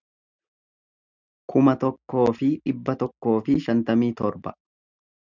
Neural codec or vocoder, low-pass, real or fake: none; 7.2 kHz; real